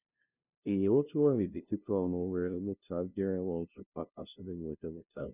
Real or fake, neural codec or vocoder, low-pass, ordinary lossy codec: fake; codec, 16 kHz, 0.5 kbps, FunCodec, trained on LibriTTS, 25 frames a second; 3.6 kHz; none